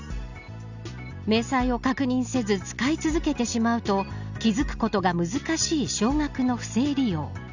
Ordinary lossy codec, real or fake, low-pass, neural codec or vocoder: none; real; 7.2 kHz; none